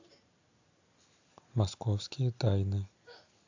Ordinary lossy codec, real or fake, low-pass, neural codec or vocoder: AAC, 48 kbps; real; 7.2 kHz; none